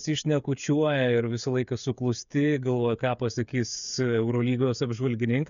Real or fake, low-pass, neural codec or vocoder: fake; 7.2 kHz; codec, 16 kHz, 8 kbps, FreqCodec, smaller model